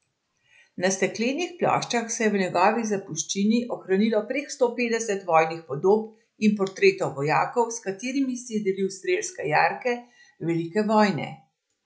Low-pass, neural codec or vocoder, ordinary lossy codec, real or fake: none; none; none; real